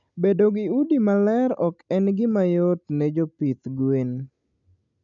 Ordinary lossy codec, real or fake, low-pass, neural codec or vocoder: none; real; 7.2 kHz; none